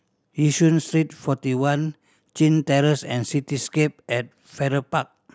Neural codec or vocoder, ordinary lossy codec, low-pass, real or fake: none; none; none; real